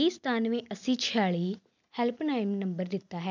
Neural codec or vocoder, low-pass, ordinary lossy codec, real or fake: none; 7.2 kHz; none; real